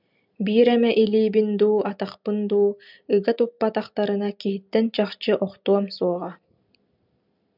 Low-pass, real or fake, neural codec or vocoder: 5.4 kHz; real; none